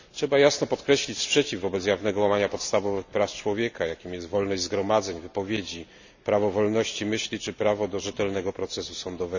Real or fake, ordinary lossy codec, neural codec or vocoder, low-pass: real; none; none; 7.2 kHz